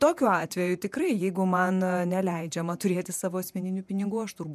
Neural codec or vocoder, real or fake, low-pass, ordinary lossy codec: vocoder, 48 kHz, 128 mel bands, Vocos; fake; 14.4 kHz; MP3, 96 kbps